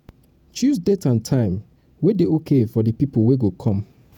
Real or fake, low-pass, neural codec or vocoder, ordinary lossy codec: fake; 19.8 kHz; vocoder, 48 kHz, 128 mel bands, Vocos; none